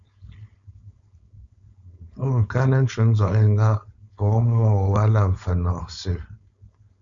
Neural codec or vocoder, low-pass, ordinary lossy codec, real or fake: codec, 16 kHz, 4.8 kbps, FACodec; 7.2 kHz; Opus, 64 kbps; fake